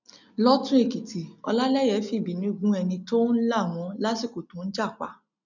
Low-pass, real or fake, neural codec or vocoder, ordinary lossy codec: 7.2 kHz; real; none; none